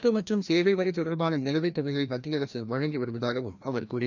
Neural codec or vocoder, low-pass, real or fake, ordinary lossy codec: codec, 16 kHz, 1 kbps, FreqCodec, larger model; 7.2 kHz; fake; none